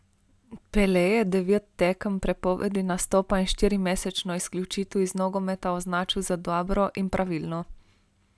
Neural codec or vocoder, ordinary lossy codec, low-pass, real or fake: none; none; none; real